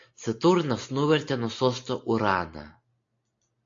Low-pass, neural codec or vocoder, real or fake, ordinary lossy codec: 7.2 kHz; none; real; AAC, 48 kbps